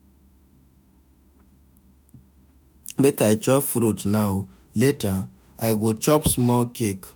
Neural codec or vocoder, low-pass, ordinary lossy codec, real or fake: autoencoder, 48 kHz, 32 numbers a frame, DAC-VAE, trained on Japanese speech; none; none; fake